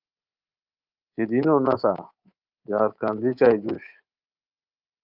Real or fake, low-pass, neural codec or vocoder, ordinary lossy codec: fake; 5.4 kHz; codec, 24 kHz, 3.1 kbps, DualCodec; Opus, 32 kbps